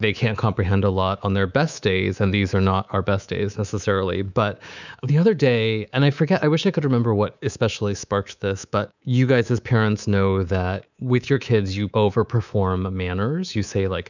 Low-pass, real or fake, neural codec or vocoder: 7.2 kHz; fake; codec, 24 kHz, 3.1 kbps, DualCodec